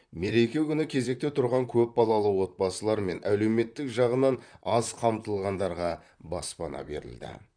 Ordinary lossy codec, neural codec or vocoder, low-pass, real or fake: none; vocoder, 44.1 kHz, 128 mel bands, Pupu-Vocoder; 9.9 kHz; fake